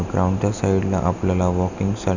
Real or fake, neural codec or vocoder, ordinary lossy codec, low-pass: real; none; none; 7.2 kHz